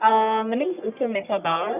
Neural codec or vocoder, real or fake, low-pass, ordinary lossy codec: codec, 44.1 kHz, 1.7 kbps, Pupu-Codec; fake; 3.6 kHz; none